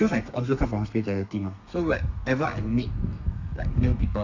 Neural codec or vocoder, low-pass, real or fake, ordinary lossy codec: codec, 32 kHz, 1.9 kbps, SNAC; 7.2 kHz; fake; Opus, 64 kbps